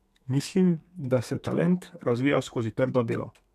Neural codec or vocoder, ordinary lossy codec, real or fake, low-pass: codec, 32 kHz, 1.9 kbps, SNAC; none; fake; 14.4 kHz